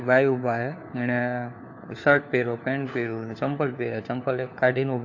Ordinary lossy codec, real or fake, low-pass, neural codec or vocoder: AAC, 48 kbps; fake; 7.2 kHz; codec, 16 kHz, 4 kbps, FunCodec, trained on LibriTTS, 50 frames a second